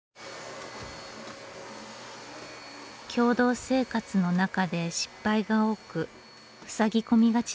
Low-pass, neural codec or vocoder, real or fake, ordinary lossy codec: none; none; real; none